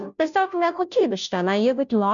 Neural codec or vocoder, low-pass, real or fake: codec, 16 kHz, 0.5 kbps, FunCodec, trained on Chinese and English, 25 frames a second; 7.2 kHz; fake